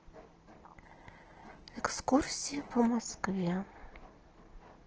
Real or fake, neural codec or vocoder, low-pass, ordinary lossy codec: real; none; 7.2 kHz; Opus, 16 kbps